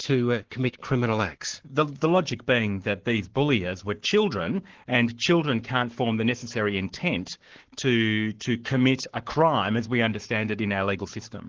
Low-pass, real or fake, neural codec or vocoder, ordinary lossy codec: 7.2 kHz; fake; codec, 44.1 kHz, 7.8 kbps, Pupu-Codec; Opus, 16 kbps